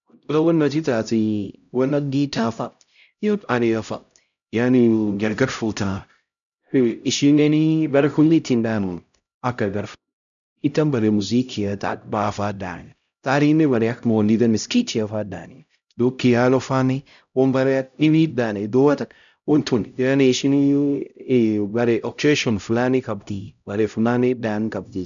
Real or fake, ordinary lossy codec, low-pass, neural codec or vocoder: fake; none; 7.2 kHz; codec, 16 kHz, 0.5 kbps, X-Codec, HuBERT features, trained on LibriSpeech